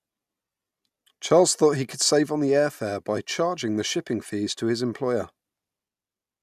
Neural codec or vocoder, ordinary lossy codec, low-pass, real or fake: none; none; 14.4 kHz; real